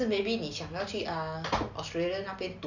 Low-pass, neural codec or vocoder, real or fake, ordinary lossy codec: 7.2 kHz; none; real; none